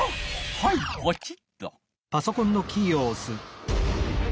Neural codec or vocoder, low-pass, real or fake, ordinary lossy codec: none; none; real; none